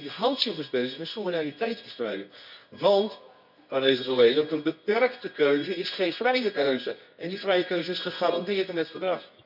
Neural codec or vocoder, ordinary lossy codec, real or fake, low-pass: codec, 24 kHz, 0.9 kbps, WavTokenizer, medium music audio release; none; fake; 5.4 kHz